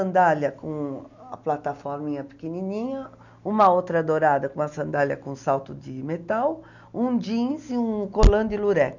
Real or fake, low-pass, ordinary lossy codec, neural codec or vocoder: real; 7.2 kHz; none; none